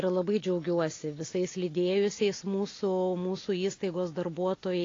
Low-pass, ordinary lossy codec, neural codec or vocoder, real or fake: 7.2 kHz; AAC, 32 kbps; none; real